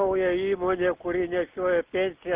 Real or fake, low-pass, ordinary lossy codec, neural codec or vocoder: real; 3.6 kHz; Opus, 32 kbps; none